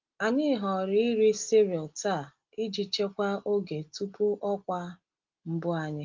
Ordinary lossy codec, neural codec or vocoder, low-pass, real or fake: Opus, 32 kbps; none; 7.2 kHz; real